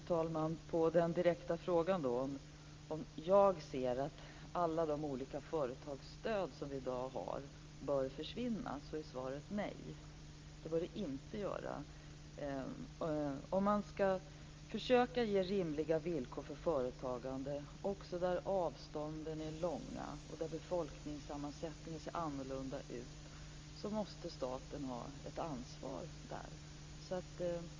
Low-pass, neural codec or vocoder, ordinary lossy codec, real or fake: 7.2 kHz; none; Opus, 32 kbps; real